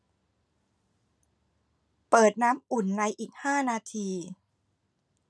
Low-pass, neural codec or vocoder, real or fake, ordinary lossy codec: none; none; real; none